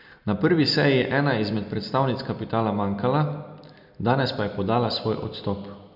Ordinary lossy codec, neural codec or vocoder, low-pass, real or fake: none; none; 5.4 kHz; real